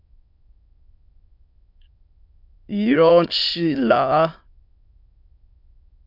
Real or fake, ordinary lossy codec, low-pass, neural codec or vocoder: fake; MP3, 48 kbps; 5.4 kHz; autoencoder, 22.05 kHz, a latent of 192 numbers a frame, VITS, trained on many speakers